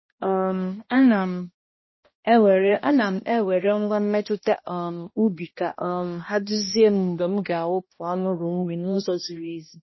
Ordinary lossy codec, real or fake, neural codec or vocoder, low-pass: MP3, 24 kbps; fake; codec, 16 kHz, 1 kbps, X-Codec, HuBERT features, trained on balanced general audio; 7.2 kHz